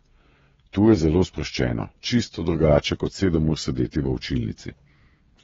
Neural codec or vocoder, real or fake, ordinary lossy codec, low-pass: codec, 16 kHz, 16 kbps, FreqCodec, smaller model; fake; AAC, 24 kbps; 7.2 kHz